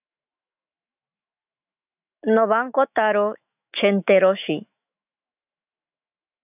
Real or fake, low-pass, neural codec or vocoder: fake; 3.6 kHz; autoencoder, 48 kHz, 128 numbers a frame, DAC-VAE, trained on Japanese speech